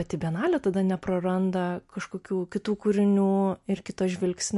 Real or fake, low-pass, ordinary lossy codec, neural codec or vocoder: real; 14.4 kHz; MP3, 48 kbps; none